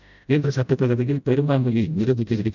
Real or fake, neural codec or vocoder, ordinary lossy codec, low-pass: fake; codec, 16 kHz, 0.5 kbps, FreqCodec, smaller model; none; 7.2 kHz